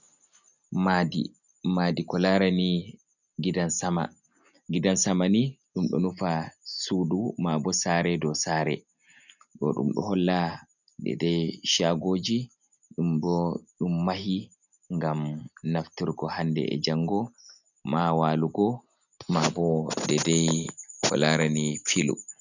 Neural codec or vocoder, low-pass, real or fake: none; 7.2 kHz; real